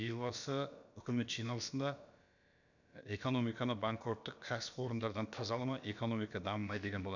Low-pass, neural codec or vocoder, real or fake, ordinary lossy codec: 7.2 kHz; codec, 16 kHz, about 1 kbps, DyCAST, with the encoder's durations; fake; none